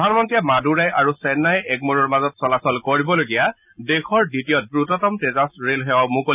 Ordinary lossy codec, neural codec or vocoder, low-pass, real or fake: none; none; 3.6 kHz; real